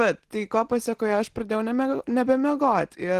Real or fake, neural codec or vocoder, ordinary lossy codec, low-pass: real; none; Opus, 16 kbps; 14.4 kHz